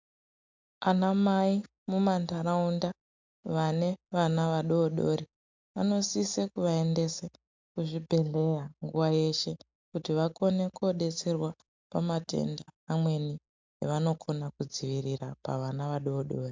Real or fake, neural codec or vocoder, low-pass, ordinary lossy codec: real; none; 7.2 kHz; MP3, 64 kbps